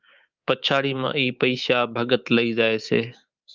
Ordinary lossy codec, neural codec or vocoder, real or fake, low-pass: Opus, 32 kbps; codec, 24 kHz, 3.1 kbps, DualCodec; fake; 7.2 kHz